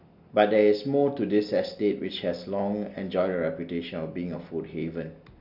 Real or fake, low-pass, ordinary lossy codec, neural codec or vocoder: real; 5.4 kHz; AAC, 48 kbps; none